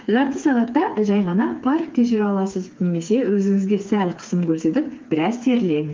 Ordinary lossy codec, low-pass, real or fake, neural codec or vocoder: Opus, 24 kbps; 7.2 kHz; fake; codec, 16 kHz, 4 kbps, FreqCodec, smaller model